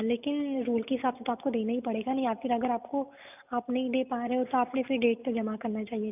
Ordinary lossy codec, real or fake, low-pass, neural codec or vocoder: none; real; 3.6 kHz; none